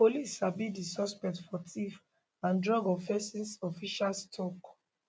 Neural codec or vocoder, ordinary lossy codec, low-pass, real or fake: none; none; none; real